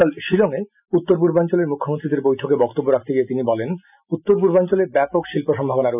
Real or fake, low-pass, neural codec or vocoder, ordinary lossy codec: real; 3.6 kHz; none; none